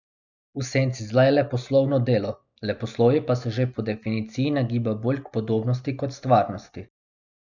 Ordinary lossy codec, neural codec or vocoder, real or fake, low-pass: none; none; real; 7.2 kHz